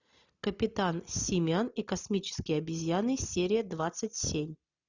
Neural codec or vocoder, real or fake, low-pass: none; real; 7.2 kHz